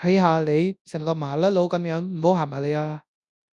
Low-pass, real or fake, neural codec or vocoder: 10.8 kHz; fake; codec, 24 kHz, 0.9 kbps, WavTokenizer, large speech release